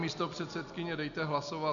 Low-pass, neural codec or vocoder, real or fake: 7.2 kHz; none; real